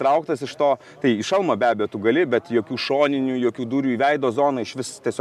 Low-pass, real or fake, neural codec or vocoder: 14.4 kHz; real; none